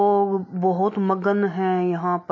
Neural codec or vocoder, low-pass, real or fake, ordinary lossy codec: none; 7.2 kHz; real; MP3, 32 kbps